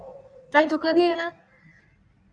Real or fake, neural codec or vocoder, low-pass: fake; codec, 16 kHz in and 24 kHz out, 1.1 kbps, FireRedTTS-2 codec; 9.9 kHz